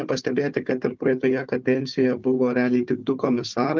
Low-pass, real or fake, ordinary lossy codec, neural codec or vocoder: 7.2 kHz; fake; Opus, 32 kbps; codec, 16 kHz, 4 kbps, FunCodec, trained on Chinese and English, 50 frames a second